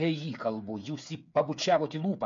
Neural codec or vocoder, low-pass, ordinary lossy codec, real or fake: codec, 16 kHz, 8 kbps, FreqCodec, smaller model; 7.2 kHz; MP3, 48 kbps; fake